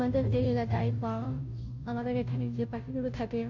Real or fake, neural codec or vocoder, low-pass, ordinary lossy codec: fake; codec, 16 kHz, 0.5 kbps, FunCodec, trained on Chinese and English, 25 frames a second; 7.2 kHz; none